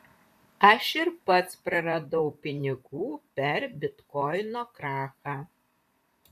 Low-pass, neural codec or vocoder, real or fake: 14.4 kHz; vocoder, 44.1 kHz, 128 mel bands, Pupu-Vocoder; fake